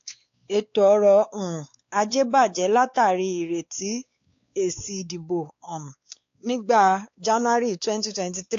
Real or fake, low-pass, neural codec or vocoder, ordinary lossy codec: fake; 7.2 kHz; codec, 16 kHz, 4 kbps, X-Codec, WavLM features, trained on Multilingual LibriSpeech; AAC, 48 kbps